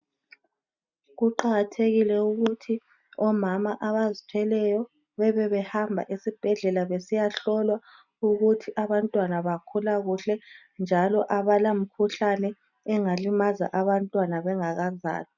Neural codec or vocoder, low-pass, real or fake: none; 7.2 kHz; real